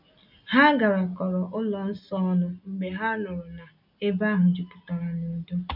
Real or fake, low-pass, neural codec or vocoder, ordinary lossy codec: real; 5.4 kHz; none; none